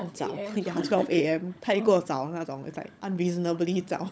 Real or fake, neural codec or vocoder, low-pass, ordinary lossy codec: fake; codec, 16 kHz, 16 kbps, FunCodec, trained on LibriTTS, 50 frames a second; none; none